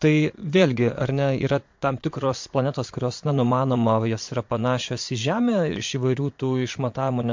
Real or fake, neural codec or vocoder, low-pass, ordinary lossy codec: fake; vocoder, 22.05 kHz, 80 mel bands, Vocos; 7.2 kHz; MP3, 48 kbps